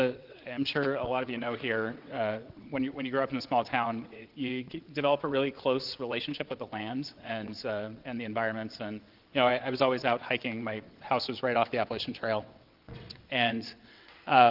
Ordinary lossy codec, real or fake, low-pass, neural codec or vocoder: Opus, 32 kbps; fake; 5.4 kHz; vocoder, 22.05 kHz, 80 mel bands, WaveNeXt